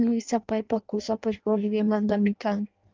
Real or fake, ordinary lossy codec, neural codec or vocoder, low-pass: fake; Opus, 32 kbps; codec, 16 kHz in and 24 kHz out, 0.6 kbps, FireRedTTS-2 codec; 7.2 kHz